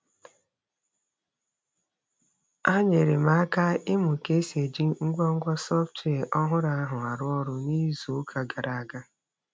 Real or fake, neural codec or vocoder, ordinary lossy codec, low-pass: real; none; none; none